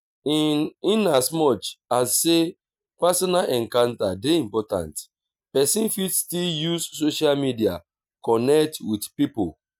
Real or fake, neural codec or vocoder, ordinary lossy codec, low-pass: real; none; none; none